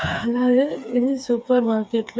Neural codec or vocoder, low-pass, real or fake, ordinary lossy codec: codec, 16 kHz, 4 kbps, FreqCodec, smaller model; none; fake; none